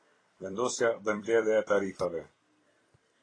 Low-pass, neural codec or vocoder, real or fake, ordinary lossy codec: 9.9 kHz; vocoder, 44.1 kHz, 128 mel bands every 256 samples, BigVGAN v2; fake; AAC, 32 kbps